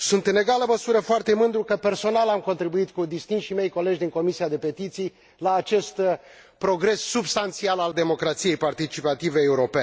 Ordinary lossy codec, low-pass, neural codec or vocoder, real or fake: none; none; none; real